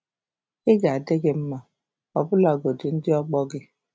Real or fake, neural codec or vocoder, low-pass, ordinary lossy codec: real; none; none; none